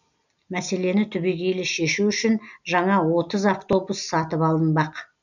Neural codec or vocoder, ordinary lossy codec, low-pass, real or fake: none; none; 7.2 kHz; real